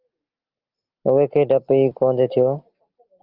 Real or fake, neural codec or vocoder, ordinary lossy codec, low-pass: real; none; Opus, 32 kbps; 5.4 kHz